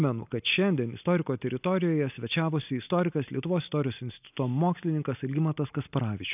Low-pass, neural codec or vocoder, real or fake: 3.6 kHz; none; real